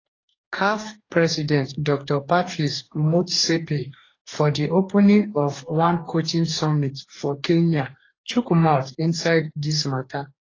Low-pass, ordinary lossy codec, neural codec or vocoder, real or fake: 7.2 kHz; AAC, 32 kbps; codec, 44.1 kHz, 2.6 kbps, DAC; fake